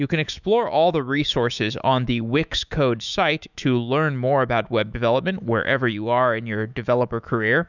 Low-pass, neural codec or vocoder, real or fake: 7.2 kHz; autoencoder, 48 kHz, 128 numbers a frame, DAC-VAE, trained on Japanese speech; fake